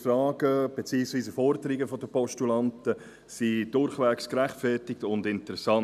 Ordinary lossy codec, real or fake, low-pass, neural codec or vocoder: none; real; 14.4 kHz; none